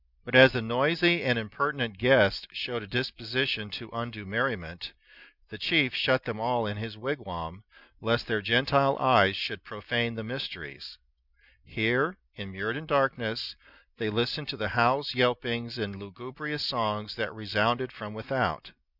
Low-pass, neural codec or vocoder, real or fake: 5.4 kHz; none; real